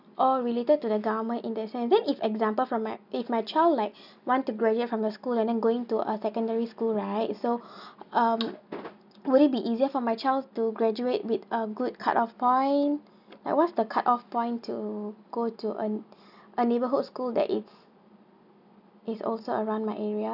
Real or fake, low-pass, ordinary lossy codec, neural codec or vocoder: real; 5.4 kHz; none; none